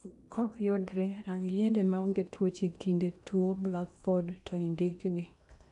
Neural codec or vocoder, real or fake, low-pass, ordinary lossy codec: codec, 16 kHz in and 24 kHz out, 0.8 kbps, FocalCodec, streaming, 65536 codes; fake; 10.8 kHz; none